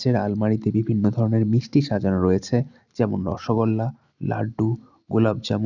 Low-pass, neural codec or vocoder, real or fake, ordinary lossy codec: 7.2 kHz; none; real; none